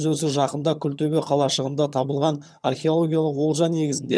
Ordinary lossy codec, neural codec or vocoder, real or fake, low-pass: none; vocoder, 22.05 kHz, 80 mel bands, HiFi-GAN; fake; none